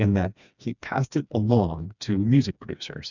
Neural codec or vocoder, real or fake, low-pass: codec, 16 kHz, 2 kbps, FreqCodec, smaller model; fake; 7.2 kHz